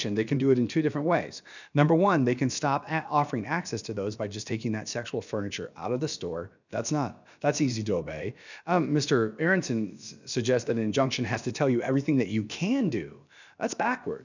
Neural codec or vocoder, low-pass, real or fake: codec, 16 kHz, about 1 kbps, DyCAST, with the encoder's durations; 7.2 kHz; fake